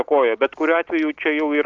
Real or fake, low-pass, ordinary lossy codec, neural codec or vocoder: real; 7.2 kHz; Opus, 16 kbps; none